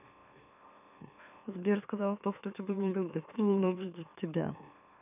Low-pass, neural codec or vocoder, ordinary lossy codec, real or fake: 3.6 kHz; autoencoder, 44.1 kHz, a latent of 192 numbers a frame, MeloTTS; none; fake